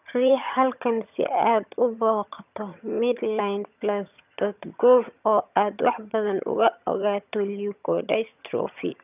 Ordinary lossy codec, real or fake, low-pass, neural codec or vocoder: none; fake; 3.6 kHz; vocoder, 22.05 kHz, 80 mel bands, HiFi-GAN